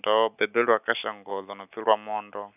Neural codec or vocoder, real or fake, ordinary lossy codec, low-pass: none; real; none; 3.6 kHz